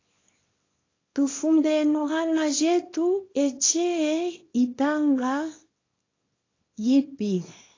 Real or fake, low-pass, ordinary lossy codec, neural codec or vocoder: fake; 7.2 kHz; AAC, 32 kbps; codec, 24 kHz, 0.9 kbps, WavTokenizer, small release